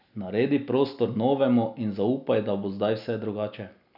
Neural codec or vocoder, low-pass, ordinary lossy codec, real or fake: none; 5.4 kHz; none; real